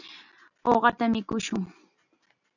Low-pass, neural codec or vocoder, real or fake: 7.2 kHz; none; real